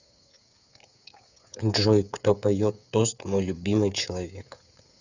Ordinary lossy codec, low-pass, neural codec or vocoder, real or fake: none; 7.2 kHz; codec, 16 kHz, 8 kbps, FreqCodec, smaller model; fake